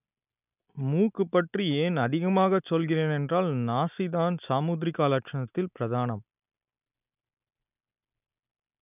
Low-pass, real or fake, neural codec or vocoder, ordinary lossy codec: 3.6 kHz; real; none; none